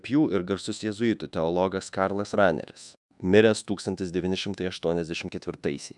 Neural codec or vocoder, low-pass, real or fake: codec, 24 kHz, 1.2 kbps, DualCodec; 10.8 kHz; fake